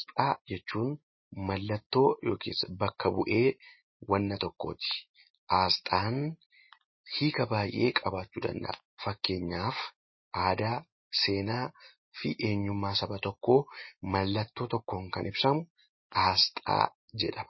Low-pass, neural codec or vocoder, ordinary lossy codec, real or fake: 7.2 kHz; none; MP3, 24 kbps; real